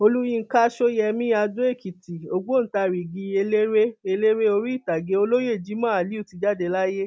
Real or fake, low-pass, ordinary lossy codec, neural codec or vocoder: real; none; none; none